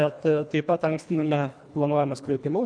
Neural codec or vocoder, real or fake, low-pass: codec, 24 kHz, 1.5 kbps, HILCodec; fake; 9.9 kHz